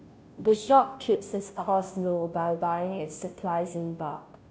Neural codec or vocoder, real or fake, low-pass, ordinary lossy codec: codec, 16 kHz, 0.5 kbps, FunCodec, trained on Chinese and English, 25 frames a second; fake; none; none